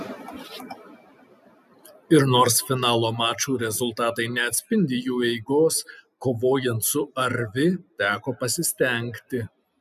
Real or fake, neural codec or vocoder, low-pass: real; none; 14.4 kHz